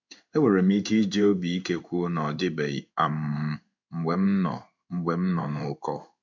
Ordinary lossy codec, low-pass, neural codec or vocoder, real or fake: MP3, 64 kbps; 7.2 kHz; codec, 16 kHz in and 24 kHz out, 1 kbps, XY-Tokenizer; fake